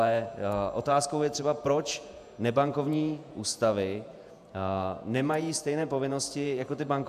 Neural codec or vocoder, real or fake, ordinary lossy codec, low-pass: vocoder, 48 kHz, 128 mel bands, Vocos; fake; AAC, 96 kbps; 14.4 kHz